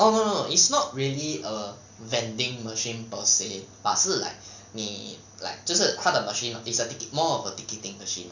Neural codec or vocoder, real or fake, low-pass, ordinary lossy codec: none; real; 7.2 kHz; none